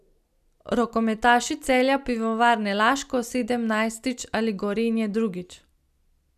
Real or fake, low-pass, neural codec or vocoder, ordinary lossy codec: real; 14.4 kHz; none; none